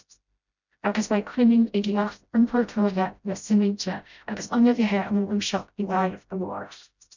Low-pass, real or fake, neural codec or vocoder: 7.2 kHz; fake; codec, 16 kHz, 0.5 kbps, FreqCodec, smaller model